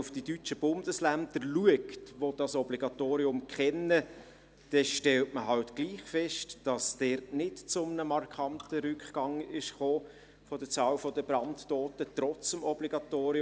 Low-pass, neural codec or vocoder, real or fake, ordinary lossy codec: none; none; real; none